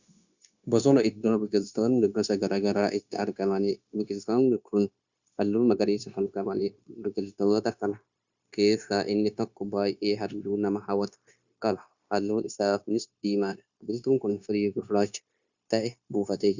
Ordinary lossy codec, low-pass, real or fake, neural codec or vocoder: Opus, 64 kbps; 7.2 kHz; fake; codec, 16 kHz, 0.9 kbps, LongCat-Audio-Codec